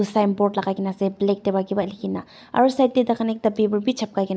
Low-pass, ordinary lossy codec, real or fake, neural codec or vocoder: none; none; real; none